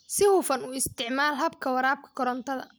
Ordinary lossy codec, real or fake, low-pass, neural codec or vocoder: none; real; none; none